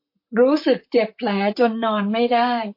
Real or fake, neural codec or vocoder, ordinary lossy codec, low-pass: fake; vocoder, 44.1 kHz, 128 mel bands, Pupu-Vocoder; MP3, 32 kbps; 5.4 kHz